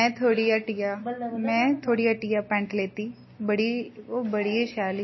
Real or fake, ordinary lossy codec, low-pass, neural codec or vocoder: real; MP3, 24 kbps; 7.2 kHz; none